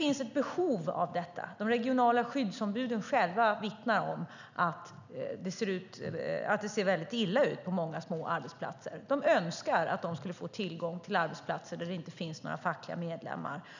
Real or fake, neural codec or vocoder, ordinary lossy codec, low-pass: real; none; none; 7.2 kHz